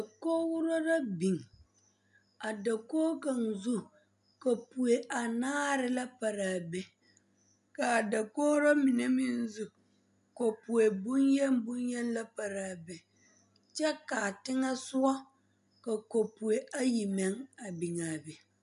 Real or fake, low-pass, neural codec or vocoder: real; 10.8 kHz; none